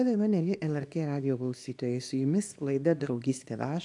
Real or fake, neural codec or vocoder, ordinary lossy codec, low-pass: fake; codec, 24 kHz, 0.9 kbps, WavTokenizer, small release; AAC, 64 kbps; 10.8 kHz